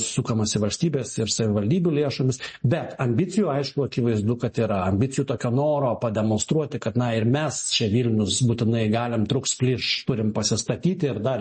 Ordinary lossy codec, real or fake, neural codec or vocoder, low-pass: MP3, 32 kbps; real; none; 10.8 kHz